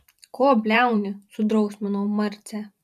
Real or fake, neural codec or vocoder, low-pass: fake; vocoder, 44.1 kHz, 128 mel bands every 512 samples, BigVGAN v2; 14.4 kHz